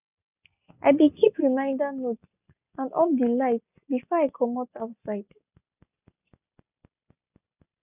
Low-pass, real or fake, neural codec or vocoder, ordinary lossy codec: 3.6 kHz; real; none; none